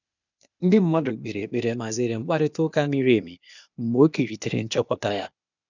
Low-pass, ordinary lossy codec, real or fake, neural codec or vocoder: 7.2 kHz; none; fake; codec, 16 kHz, 0.8 kbps, ZipCodec